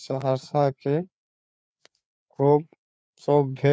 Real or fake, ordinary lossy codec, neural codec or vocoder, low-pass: fake; none; codec, 16 kHz, 4 kbps, FreqCodec, larger model; none